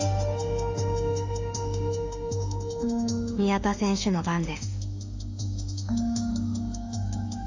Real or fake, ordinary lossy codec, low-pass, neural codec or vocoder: fake; AAC, 48 kbps; 7.2 kHz; autoencoder, 48 kHz, 32 numbers a frame, DAC-VAE, trained on Japanese speech